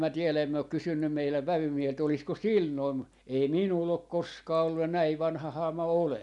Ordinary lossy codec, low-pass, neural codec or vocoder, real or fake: none; 10.8 kHz; none; real